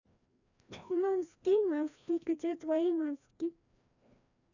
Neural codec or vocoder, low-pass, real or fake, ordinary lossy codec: codec, 16 kHz, 1 kbps, FreqCodec, larger model; 7.2 kHz; fake; none